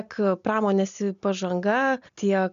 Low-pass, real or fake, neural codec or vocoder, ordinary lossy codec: 7.2 kHz; real; none; MP3, 64 kbps